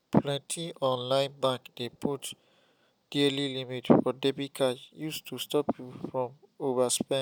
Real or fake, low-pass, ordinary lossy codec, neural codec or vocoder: real; none; none; none